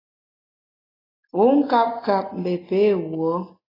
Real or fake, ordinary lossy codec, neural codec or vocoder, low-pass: real; AAC, 24 kbps; none; 5.4 kHz